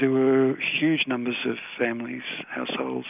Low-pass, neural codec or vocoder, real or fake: 3.6 kHz; none; real